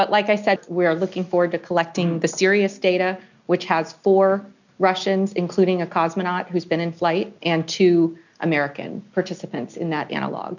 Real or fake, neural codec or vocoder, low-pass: real; none; 7.2 kHz